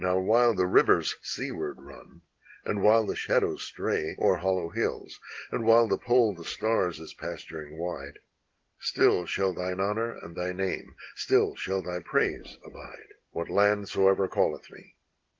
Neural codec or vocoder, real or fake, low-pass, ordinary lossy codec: none; real; 7.2 kHz; Opus, 16 kbps